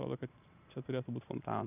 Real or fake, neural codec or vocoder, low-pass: fake; vocoder, 44.1 kHz, 128 mel bands every 512 samples, BigVGAN v2; 3.6 kHz